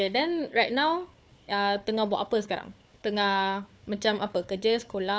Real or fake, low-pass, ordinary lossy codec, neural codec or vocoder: fake; none; none; codec, 16 kHz, 4 kbps, FunCodec, trained on Chinese and English, 50 frames a second